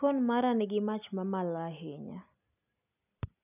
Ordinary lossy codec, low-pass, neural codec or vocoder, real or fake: none; 3.6 kHz; none; real